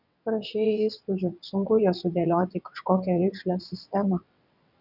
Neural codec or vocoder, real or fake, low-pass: vocoder, 22.05 kHz, 80 mel bands, WaveNeXt; fake; 5.4 kHz